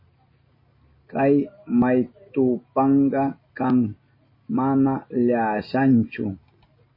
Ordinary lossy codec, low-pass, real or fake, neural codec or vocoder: MP3, 24 kbps; 5.4 kHz; real; none